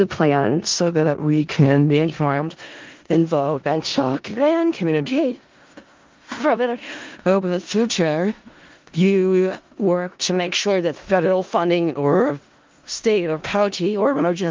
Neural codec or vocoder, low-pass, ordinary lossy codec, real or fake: codec, 16 kHz in and 24 kHz out, 0.4 kbps, LongCat-Audio-Codec, four codebook decoder; 7.2 kHz; Opus, 24 kbps; fake